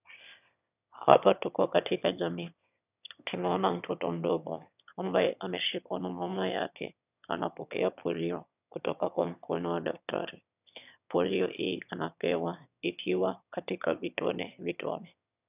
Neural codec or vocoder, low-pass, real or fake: autoencoder, 22.05 kHz, a latent of 192 numbers a frame, VITS, trained on one speaker; 3.6 kHz; fake